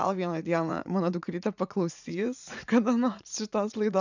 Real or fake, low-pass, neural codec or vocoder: fake; 7.2 kHz; vocoder, 44.1 kHz, 80 mel bands, Vocos